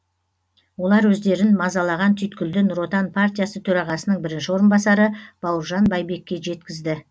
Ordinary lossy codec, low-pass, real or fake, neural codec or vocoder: none; none; real; none